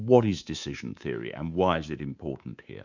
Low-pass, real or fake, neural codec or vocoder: 7.2 kHz; fake; codec, 24 kHz, 3.1 kbps, DualCodec